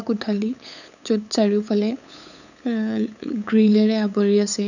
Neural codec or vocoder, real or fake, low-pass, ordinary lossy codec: codec, 16 kHz, 8 kbps, FunCodec, trained on LibriTTS, 25 frames a second; fake; 7.2 kHz; none